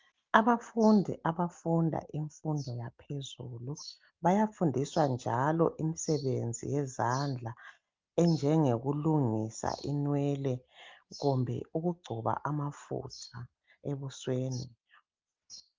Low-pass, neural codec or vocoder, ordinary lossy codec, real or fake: 7.2 kHz; none; Opus, 32 kbps; real